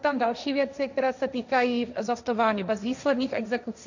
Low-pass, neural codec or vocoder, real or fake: 7.2 kHz; codec, 16 kHz, 1.1 kbps, Voila-Tokenizer; fake